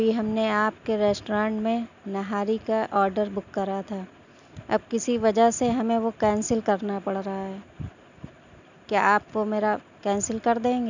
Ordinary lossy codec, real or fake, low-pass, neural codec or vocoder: none; real; 7.2 kHz; none